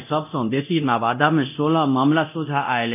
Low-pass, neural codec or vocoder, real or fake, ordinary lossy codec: 3.6 kHz; codec, 24 kHz, 0.9 kbps, DualCodec; fake; none